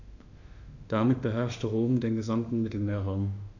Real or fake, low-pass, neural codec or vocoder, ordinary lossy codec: fake; 7.2 kHz; autoencoder, 48 kHz, 32 numbers a frame, DAC-VAE, trained on Japanese speech; none